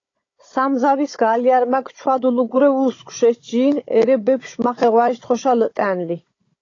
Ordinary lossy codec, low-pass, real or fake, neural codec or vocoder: AAC, 32 kbps; 7.2 kHz; fake; codec, 16 kHz, 16 kbps, FunCodec, trained on Chinese and English, 50 frames a second